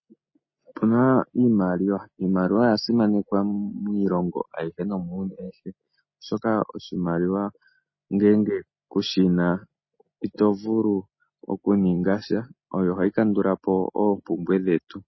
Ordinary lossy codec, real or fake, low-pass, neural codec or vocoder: MP3, 24 kbps; real; 7.2 kHz; none